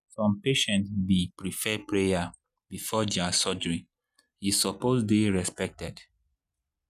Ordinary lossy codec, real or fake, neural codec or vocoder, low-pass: none; real; none; 14.4 kHz